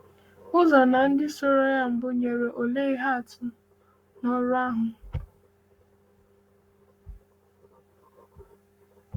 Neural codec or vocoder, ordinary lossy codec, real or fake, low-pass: codec, 44.1 kHz, 7.8 kbps, Pupu-Codec; Opus, 64 kbps; fake; 19.8 kHz